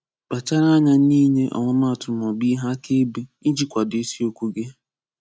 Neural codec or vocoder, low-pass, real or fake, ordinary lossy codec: none; none; real; none